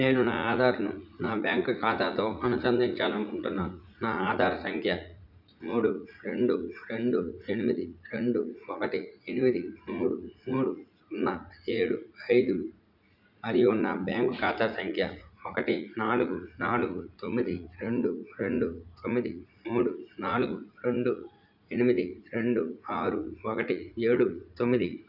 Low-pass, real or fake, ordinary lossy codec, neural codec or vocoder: 5.4 kHz; fake; none; vocoder, 44.1 kHz, 80 mel bands, Vocos